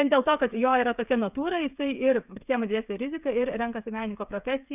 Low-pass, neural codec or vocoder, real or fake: 3.6 kHz; codec, 16 kHz, 8 kbps, FreqCodec, smaller model; fake